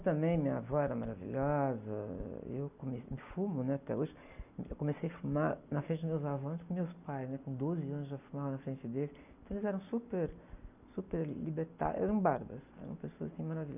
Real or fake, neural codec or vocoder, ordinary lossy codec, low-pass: real; none; none; 3.6 kHz